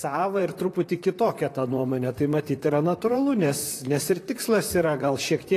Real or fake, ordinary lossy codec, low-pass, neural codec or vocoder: fake; AAC, 48 kbps; 14.4 kHz; vocoder, 44.1 kHz, 128 mel bands, Pupu-Vocoder